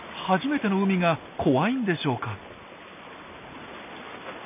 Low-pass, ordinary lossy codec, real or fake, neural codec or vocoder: 3.6 kHz; none; real; none